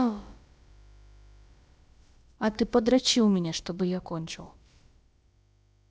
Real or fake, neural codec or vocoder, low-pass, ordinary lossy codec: fake; codec, 16 kHz, about 1 kbps, DyCAST, with the encoder's durations; none; none